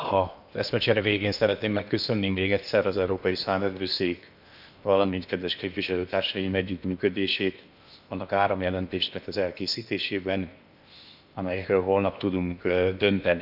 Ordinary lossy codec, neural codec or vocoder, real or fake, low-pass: none; codec, 16 kHz in and 24 kHz out, 0.8 kbps, FocalCodec, streaming, 65536 codes; fake; 5.4 kHz